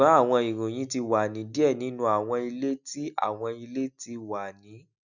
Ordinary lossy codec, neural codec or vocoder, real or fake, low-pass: none; none; real; 7.2 kHz